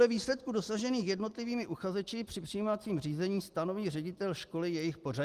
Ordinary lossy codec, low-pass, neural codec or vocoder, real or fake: Opus, 24 kbps; 14.4 kHz; none; real